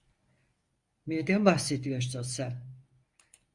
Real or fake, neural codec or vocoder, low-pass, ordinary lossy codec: fake; codec, 24 kHz, 0.9 kbps, WavTokenizer, medium speech release version 1; 10.8 kHz; Opus, 64 kbps